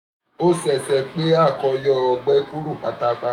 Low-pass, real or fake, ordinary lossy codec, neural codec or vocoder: 19.8 kHz; fake; none; autoencoder, 48 kHz, 128 numbers a frame, DAC-VAE, trained on Japanese speech